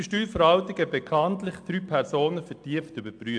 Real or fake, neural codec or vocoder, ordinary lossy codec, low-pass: real; none; AAC, 64 kbps; 9.9 kHz